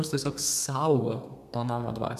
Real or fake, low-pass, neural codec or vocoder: fake; 14.4 kHz; codec, 32 kHz, 1.9 kbps, SNAC